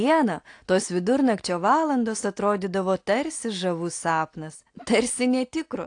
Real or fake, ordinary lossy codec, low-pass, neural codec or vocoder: real; AAC, 48 kbps; 9.9 kHz; none